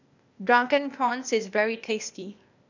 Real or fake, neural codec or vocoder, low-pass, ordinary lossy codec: fake; codec, 16 kHz, 0.8 kbps, ZipCodec; 7.2 kHz; none